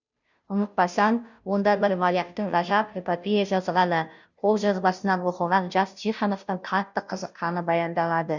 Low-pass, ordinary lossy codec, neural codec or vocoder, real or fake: 7.2 kHz; none; codec, 16 kHz, 0.5 kbps, FunCodec, trained on Chinese and English, 25 frames a second; fake